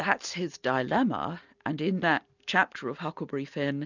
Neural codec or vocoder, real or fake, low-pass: none; real; 7.2 kHz